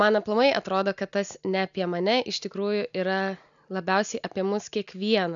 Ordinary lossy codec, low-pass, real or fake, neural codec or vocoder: MP3, 96 kbps; 7.2 kHz; real; none